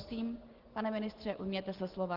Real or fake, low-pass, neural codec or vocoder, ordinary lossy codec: real; 5.4 kHz; none; Opus, 16 kbps